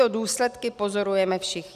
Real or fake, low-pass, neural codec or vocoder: real; 14.4 kHz; none